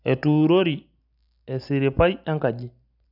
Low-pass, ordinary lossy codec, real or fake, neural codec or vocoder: 5.4 kHz; none; real; none